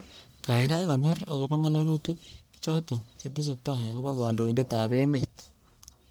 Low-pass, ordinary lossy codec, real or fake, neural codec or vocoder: none; none; fake; codec, 44.1 kHz, 1.7 kbps, Pupu-Codec